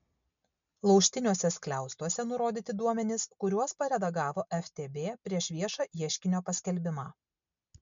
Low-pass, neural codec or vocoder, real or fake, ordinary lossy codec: 7.2 kHz; none; real; MP3, 64 kbps